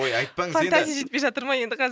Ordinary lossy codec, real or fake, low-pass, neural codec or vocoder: none; real; none; none